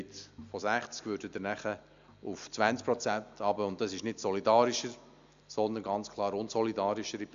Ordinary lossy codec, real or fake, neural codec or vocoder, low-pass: MP3, 64 kbps; real; none; 7.2 kHz